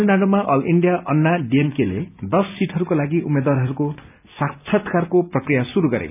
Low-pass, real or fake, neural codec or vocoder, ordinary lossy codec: 3.6 kHz; real; none; none